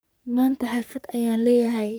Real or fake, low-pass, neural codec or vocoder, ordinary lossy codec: fake; none; codec, 44.1 kHz, 3.4 kbps, Pupu-Codec; none